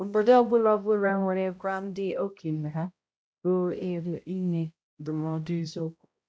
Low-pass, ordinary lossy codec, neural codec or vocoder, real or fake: none; none; codec, 16 kHz, 0.5 kbps, X-Codec, HuBERT features, trained on balanced general audio; fake